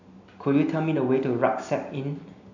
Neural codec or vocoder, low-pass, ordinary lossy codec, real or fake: none; 7.2 kHz; none; real